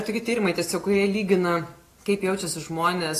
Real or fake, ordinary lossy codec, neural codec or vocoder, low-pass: real; AAC, 48 kbps; none; 14.4 kHz